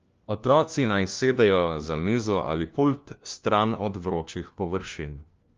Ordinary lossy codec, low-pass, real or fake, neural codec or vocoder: Opus, 32 kbps; 7.2 kHz; fake; codec, 16 kHz, 1 kbps, FunCodec, trained on LibriTTS, 50 frames a second